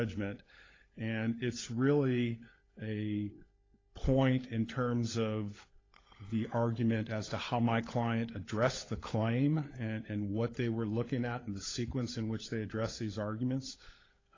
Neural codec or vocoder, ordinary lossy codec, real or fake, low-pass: codec, 16 kHz, 16 kbps, FunCodec, trained on LibriTTS, 50 frames a second; AAC, 32 kbps; fake; 7.2 kHz